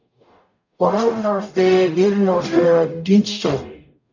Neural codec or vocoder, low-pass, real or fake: codec, 44.1 kHz, 0.9 kbps, DAC; 7.2 kHz; fake